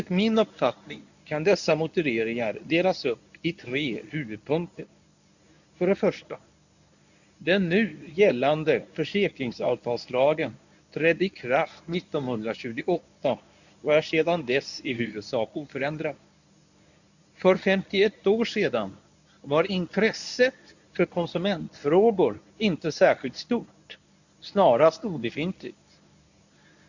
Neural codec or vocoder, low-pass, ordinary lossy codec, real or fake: codec, 24 kHz, 0.9 kbps, WavTokenizer, medium speech release version 1; 7.2 kHz; none; fake